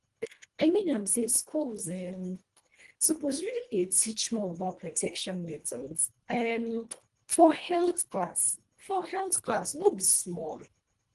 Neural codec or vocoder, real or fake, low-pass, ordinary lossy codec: codec, 24 kHz, 1.5 kbps, HILCodec; fake; 10.8 kHz; Opus, 24 kbps